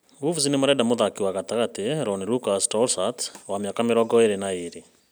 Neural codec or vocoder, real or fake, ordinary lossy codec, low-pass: none; real; none; none